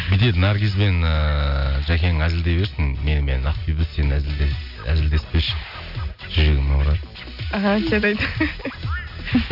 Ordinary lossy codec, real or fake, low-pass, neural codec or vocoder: none; real; 5.4 kHz; none